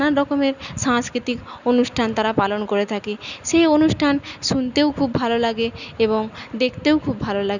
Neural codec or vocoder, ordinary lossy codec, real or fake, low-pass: none; none; real; 7.2 kHz